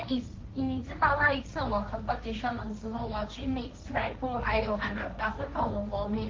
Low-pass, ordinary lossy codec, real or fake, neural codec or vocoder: 7.2 kHz; Opus, 32 kbps; fake; codec, 16 kHz, 1.1 kbps, Voila-Tokenizer